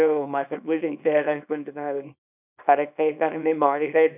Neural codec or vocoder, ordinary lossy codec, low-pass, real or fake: codec, 24 kHz, 0.9 kbps, WavTokenizer, small release; none; 3.6 kHz; fake